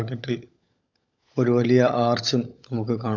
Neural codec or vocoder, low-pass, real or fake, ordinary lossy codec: codec, 16 kHz, 16 kbps, FunCodec, trained on LibriTTS, 50 frames a second; 7.2 kHz; fake; none